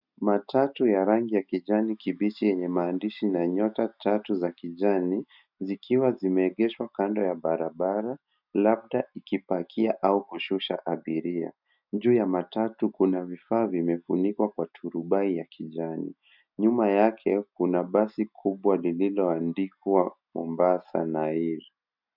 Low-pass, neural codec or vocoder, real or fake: 5.4 kHz; none; real